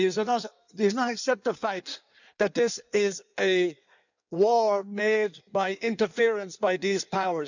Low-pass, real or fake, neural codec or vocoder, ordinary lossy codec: 7.2 kHz; fake; codec, 16 kHz in and 24 kHz out, 1.1 kbps, FireRedTTS-2 codec; none